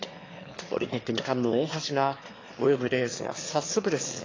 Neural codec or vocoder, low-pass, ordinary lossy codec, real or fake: autoencoder, 22.05 kHz, a latent of 192 numbers a frame, VITS, trained on one speaker; 7.2 kHz; AAC, 32 kbps; fake